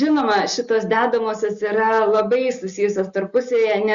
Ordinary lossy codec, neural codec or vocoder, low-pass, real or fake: Opus, 64 kbps; none; 7.2 kHz; real